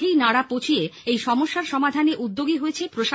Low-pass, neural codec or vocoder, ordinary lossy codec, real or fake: none; none; none; real